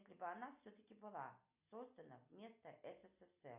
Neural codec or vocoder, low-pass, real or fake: none; 3.6 kHz; real